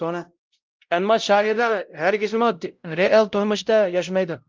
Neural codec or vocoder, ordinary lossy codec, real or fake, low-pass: codec, 16 kHz, 0.5 kbps, X-Codec, WavLM features, trained on Multilingual LibriSpeech; Opus, 32 kbps; fake; 7.2 kHz